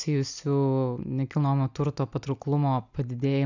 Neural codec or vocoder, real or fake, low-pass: none; real; 7.2 kHz